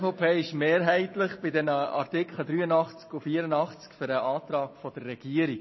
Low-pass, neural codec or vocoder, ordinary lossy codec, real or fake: 7.2 kHz; none; MP3, 24 kbps; real